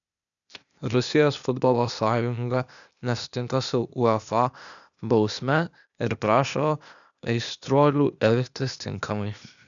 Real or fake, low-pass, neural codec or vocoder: fake; 7.2 kHz; codec, 16 kHz, 0.8 kbps, ZipCodec